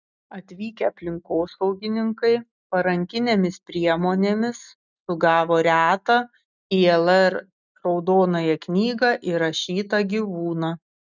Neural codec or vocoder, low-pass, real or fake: none; 7.2 kHz; real